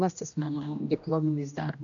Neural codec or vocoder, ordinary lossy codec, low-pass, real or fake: codec, 16 kHz, 1 kbps, X-Codec, HuBERT features, trained on general audio; AAC, 64 kbps; 7.2 kHz; fake